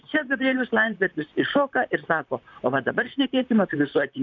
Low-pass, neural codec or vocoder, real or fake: 7.2 kHz; vocoder, 44.1 kHz, 128 mel bands, Pupu-Vocoder; fake